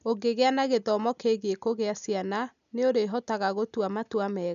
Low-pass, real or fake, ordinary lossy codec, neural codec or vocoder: 7.2 kHz; real; none; none